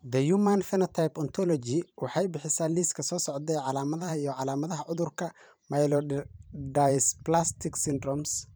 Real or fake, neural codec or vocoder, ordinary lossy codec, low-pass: fake; vocoder, 44.1 kHz, 128 mel bands every 512 samples, BigVGAN v2; none; none